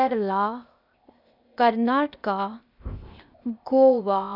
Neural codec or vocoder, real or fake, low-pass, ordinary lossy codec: codec, 16 kHz, 0.8 kbps, ZipCodec; fake; 5.4 kHz; none